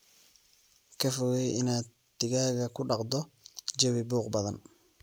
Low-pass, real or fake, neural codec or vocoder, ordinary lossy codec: none; real; none; none